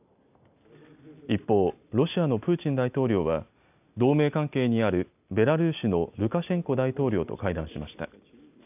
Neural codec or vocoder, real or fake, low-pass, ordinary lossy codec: none; real; 3.6 kHz; none